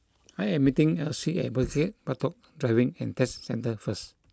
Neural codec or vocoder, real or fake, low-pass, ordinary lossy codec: none; real; none; none